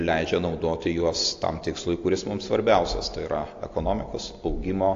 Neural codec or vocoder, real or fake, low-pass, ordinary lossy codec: none; real; 7.2 kHz; AAC, 48 kbps